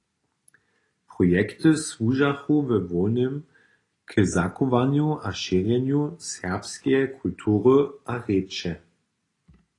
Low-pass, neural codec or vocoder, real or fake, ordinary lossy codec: 10.8 kHz; none; real; AAC, 32 kbps